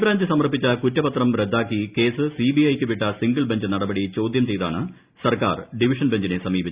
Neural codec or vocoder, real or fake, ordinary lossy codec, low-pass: none; real; Opus, 64 kbps; 3.6 kHz